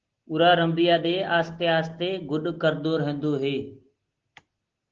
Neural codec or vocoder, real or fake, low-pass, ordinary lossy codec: none; real; 7.2 kHz; Opus, 16 kbps